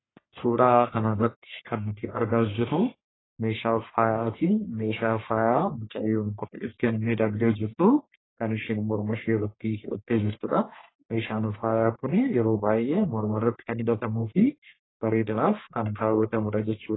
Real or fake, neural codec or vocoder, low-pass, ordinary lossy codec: fake; codec, 44.1 kHz, 1.7 kbps, Pupu-Codec; 7.2 kHz; AAC, 16 kbps